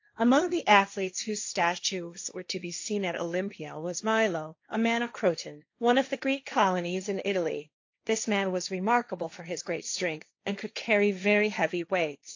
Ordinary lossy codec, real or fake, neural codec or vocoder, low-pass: AAC, 48 kbps; fake; codec, 16 kHz, 1.1 kbps, Voila-Tokenizer; 7.2 kHz